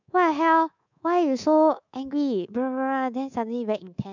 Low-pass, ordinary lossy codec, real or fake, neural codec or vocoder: 7.2 kHz; none; fake; codec, 24 kHz, 3.1 kbps, DualCodec